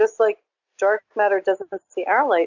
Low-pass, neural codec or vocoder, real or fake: 7.2 kHz; none; real